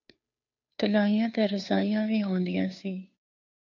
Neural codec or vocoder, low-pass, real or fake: codec, 16 kHz, 2 kbps, FunCodec, trained on Chinese and English, 25 frames a second; 7.2 kHz; fake